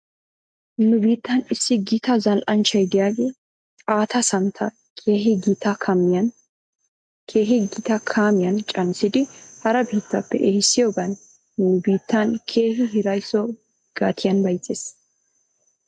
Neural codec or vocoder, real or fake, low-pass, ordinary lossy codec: none; real; 9.9 kHz; MP3, 64 kbps